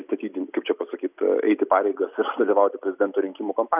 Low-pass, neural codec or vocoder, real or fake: 3.6 kHz; none; real